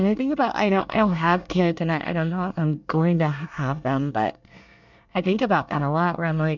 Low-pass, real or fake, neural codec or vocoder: 7.2 kHz; fake; codec, 24 kHz, 1 kbps, SNAC